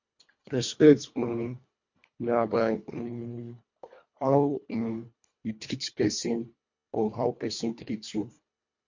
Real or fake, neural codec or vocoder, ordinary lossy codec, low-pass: fake; codec, 24 kHz, 1.5 kbps, HILCodec; MP3, 48 kbps; 7.2 kHz